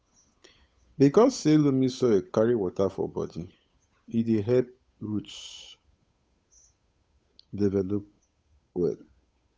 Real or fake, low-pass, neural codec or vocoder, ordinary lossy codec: fake; none; codec, 16 kHz, 8 kbps, FunCodec, trained on Chinese and English, 25 frames a second; none